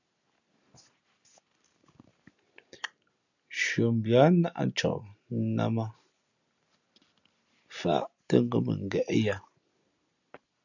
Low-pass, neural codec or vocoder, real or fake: 7.2 kHz; none; real